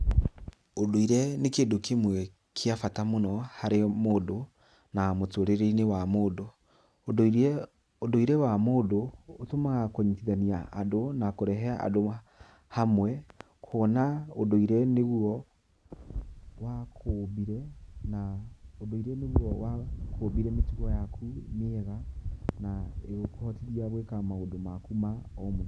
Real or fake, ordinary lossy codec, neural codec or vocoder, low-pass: real; none; none; none